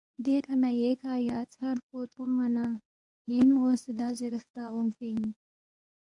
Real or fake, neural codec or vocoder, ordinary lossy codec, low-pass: fake; codec, 24 kHz, 0.9 kbps, WavTokenizer, medium speech release version 1; AAC, 64 kbps; 10.8 kHz